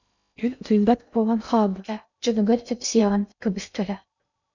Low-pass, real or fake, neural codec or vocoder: 7.2 kHz; fake; codec, 16 kHz in and 24 kHz out, 0.6 kbps, FocalCodec, streaming, 2048 codes